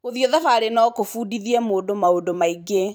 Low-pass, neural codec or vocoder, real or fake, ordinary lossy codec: none; vocoder, 44.1 kHz, 128 mel bands every 256 samples, BigVGAN v2; fake; none